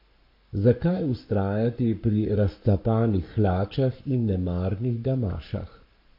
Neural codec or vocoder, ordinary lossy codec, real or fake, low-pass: codec, 44.1 kHz, 7.8 kbps, Pupu-Codec; MP3, 32 kbps; fake; 5.4 kHz